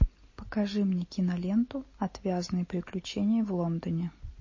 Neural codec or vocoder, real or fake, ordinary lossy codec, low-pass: none; real; MP3, 32 kbps; 7.2 kHz